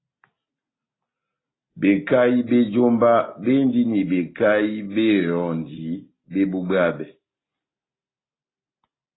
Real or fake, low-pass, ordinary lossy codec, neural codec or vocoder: real; 7.2 kHz; AAC, 16 kbps; none